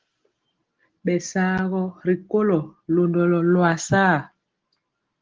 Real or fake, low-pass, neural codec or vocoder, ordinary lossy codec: real; 7.2 kHz; none; Opus, 16 kbps